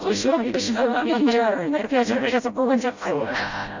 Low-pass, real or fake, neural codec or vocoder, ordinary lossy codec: 7.2 kHz; fake; codec, 16 kHz, 0.5 kbps, FreqCodec, smaller model; Opus, 64 kbps